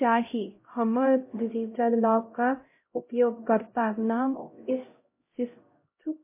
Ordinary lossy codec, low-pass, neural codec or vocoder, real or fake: MP3, 24 kbps; 3.6 kHz; codec, 16 kHz, 0.5 kbps, X-Codec, HuBERT features, trained on LibriSpeech; fake